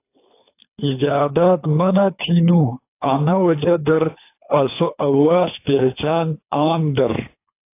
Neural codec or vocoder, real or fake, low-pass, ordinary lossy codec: codec, 24 kHz, 3 kbps, HILCodec; fake; 3.6 kHz; AAC, 24 kbps